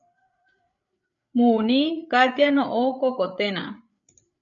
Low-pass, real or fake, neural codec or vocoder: 7.2 kHz; fake; codec, 16 kHz, 8 kbps, FreqCodec, larger model